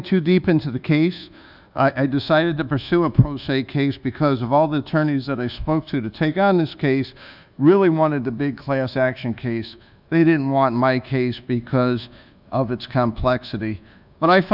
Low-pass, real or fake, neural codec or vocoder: 5.4 kHz; fake; codec, 24 kHz, 1.2 kbps, DualCodec